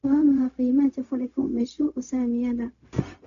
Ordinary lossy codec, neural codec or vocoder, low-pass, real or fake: none; codec, 16 kHz, 0.4 kbps, LongCat-Audio-Codec; 7.2 kHz; fake